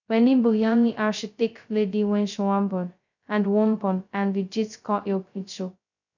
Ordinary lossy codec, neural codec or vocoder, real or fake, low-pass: none; codec, 16 kHz, 0.2 kbps, FocalCodec; fake; 7.2 kHz